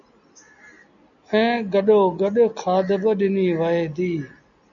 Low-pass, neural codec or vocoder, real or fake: 7.2 kHz; none; real